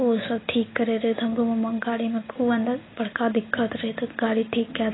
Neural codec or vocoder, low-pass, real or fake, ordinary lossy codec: none; 7.2 kHz; real; AAC, 16 kbps